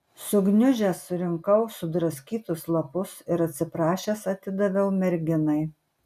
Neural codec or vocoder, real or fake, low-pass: none; real; 14.4 kHz